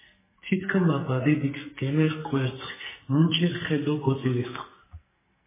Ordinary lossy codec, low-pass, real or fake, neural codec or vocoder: MP3, 16 kbps; 3.6 kHz; fake; codec, 44.1 kHz, 2.6 kbps, SNAC